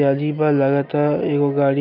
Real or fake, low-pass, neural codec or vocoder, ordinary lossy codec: real; 5.4 kHz; none; none